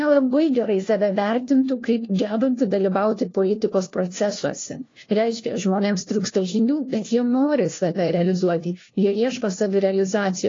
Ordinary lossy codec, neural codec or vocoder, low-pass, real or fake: AAC, 32 kbps; codec, 16 kHz, 1 kbps, FunCodec, trained on LibriTTS, 50 frames a second; 7.2 kHz; fake